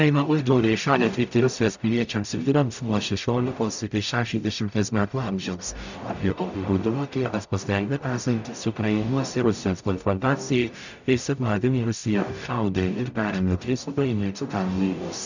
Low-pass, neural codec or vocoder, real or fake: 7.2 kHz; codec, 44.1 kHz, 0.9 kbps, DAC; fake